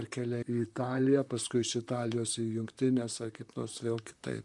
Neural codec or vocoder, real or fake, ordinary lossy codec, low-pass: vocoder, 44.1 kHz, 128 mel bands, Pupu-Vocoder; fake; MP3, 96 kbps; 10.8 kHz